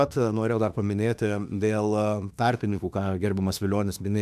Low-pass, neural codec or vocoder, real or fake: 14.4 kHz; autoencoder, 48 kHz, 32 numbers a frame, DAC-VAE, trained on Japanese speech; fake